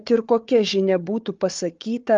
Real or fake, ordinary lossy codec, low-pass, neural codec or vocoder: fake; Opus, 32 kbps; 7.2 kHz; codec, 16 kHz, 4 kbps, FunCodec, trained on Chinese and English, 50 frames a second